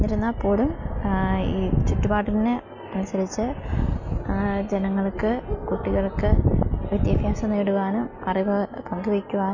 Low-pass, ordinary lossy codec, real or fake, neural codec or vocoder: 7.2 kHz; none; real; none